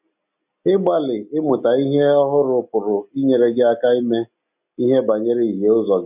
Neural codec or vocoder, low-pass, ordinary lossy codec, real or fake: none; 3.6 kHz; none; real